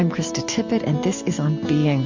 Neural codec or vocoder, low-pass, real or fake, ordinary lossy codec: none; 7.2 kHz; real; MP3, 48 kbps